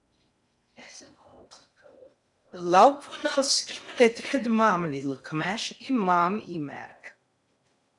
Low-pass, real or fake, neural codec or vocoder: 10.8 kHz; fake; codec, 16 kHz in and 24 kHz out, 0.6 kbps, FocalCodec, streaming, 4096 codes